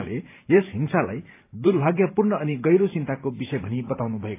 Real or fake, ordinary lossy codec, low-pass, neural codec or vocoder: fake; AAC, 24 kbps; 3.6 kHz; vocoder, 44.1 kHz, 128 mel bands every 512 samples, BigVGAN v2